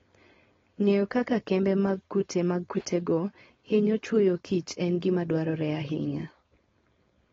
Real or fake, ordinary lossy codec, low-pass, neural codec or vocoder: fake; AAC, 24 kbps; 7.2 kHz; codec, 16 kHz, 4.8 kbps, FACodec